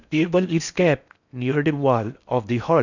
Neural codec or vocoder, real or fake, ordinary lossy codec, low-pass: codec, 16 kHz in and 24 kHz out, 0.6 kbps, FocalCodec, streaming, 4096 codes; fake; none; 7.2 kHz